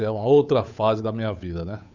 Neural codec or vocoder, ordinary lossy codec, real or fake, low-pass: codec, 16 kHz, 8 kbps, FunCodec, trained on Chinese and English, 25 frames a second; none; fake; 7.2 kHz